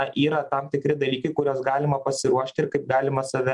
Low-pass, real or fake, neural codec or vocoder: 10.8 kHz; real; none